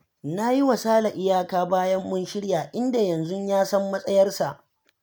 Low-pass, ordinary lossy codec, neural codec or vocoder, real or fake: none; none; none; real